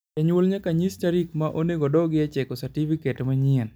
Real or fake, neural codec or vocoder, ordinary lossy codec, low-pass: real; none; none; none